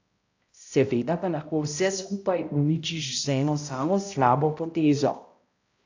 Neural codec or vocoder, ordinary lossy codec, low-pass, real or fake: codec, 16 kHz, 0.5 kbps, X-Codec, HuBERT features, trained on balanced general audio; AAC, 48 kbps; 7.2 kHz; fake